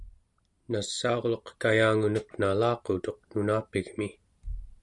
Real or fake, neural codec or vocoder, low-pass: real; none; 10.8 kHz